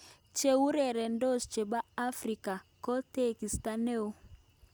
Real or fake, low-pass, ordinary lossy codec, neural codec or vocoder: real; none; none; none